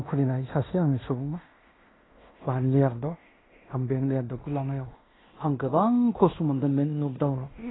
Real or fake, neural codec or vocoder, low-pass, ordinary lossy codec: fake; codec, 16 kHz in and 24 kHz out, 0.9 kbps, LongCat-Audio-Codec, fine tuned four codebook decoder; 7.2 kHz; AAC, 16 kbps